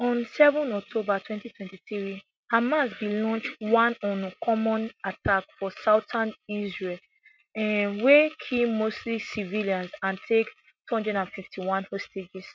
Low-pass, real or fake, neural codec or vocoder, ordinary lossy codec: none; real; none; none